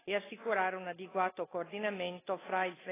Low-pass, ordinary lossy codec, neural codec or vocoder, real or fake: 3.6 kHz; AAC, 16 kbps; none; real